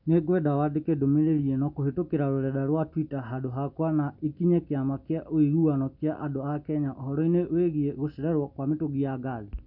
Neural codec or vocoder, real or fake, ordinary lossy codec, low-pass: none; real; none; 5.4 kHz